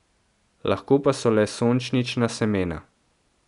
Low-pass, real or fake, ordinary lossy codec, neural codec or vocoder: 10.8 kHz; real; none; none